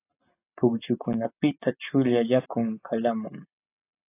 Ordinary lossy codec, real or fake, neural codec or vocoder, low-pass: AAC, 24 kbps; real; none; 3.6 kHz